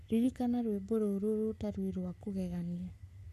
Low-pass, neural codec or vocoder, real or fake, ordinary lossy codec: 14.4 kHz; codec, 44.1 kHz, 7.8 kbps, Pupu-Codec; fake; none